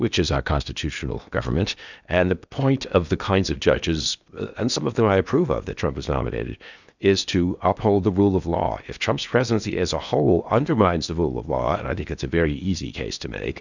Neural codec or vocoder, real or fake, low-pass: codec, 16 kHz in and 24 kHz out, 0.8 kbps, FocalCodec, streaming, 65536 codes; fake; 7.2 kHz